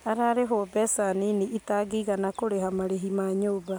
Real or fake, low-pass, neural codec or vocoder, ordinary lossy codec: real; none; none; none